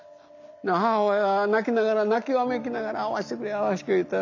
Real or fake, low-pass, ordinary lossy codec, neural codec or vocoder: real; 7.2 kHz; none; none